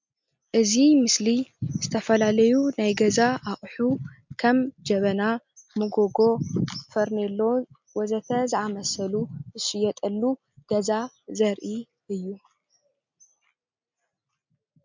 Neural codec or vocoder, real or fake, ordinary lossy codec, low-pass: none; real; MP3, 64 kbps; 7.2 kHz